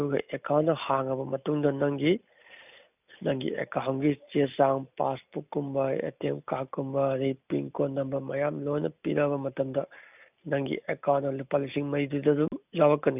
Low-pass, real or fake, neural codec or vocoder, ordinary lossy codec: 3.6 kHz; real; none; none